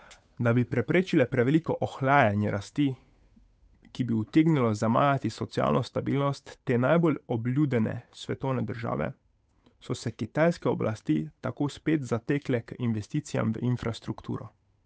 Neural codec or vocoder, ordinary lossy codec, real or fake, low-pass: codec, 16 kHz, 8 kbps, FunCodec, trained on Chinese and English, 25 frames a second; none; fake; none